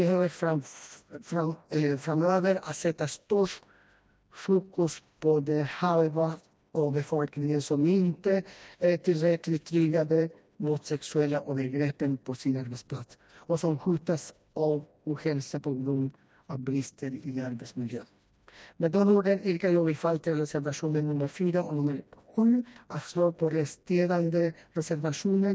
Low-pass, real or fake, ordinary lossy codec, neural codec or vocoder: none; fake; none; codec, 16 kHz, 1 kbps, FreqCodec, smaller model